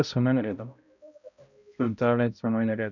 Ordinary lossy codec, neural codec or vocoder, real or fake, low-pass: none; codec, 16 kHz, 0.5 kbps, X-Codec, HuBERT features, trained on balanced general audio; fake; 7.2 kHz